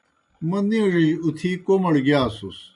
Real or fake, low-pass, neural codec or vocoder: real; 10.8 kHz; none